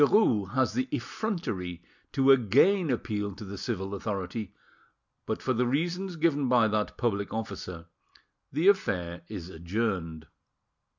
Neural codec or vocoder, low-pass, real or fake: none; 7.2 kHz; real